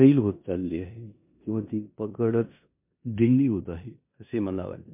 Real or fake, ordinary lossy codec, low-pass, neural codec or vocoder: fake; MP3, 32 kbps; 3.6 kHz; codec, 16 kHz in and 24 kHz out, 0.9 kbps, LongCat-Audio-Codec, four codebook decoder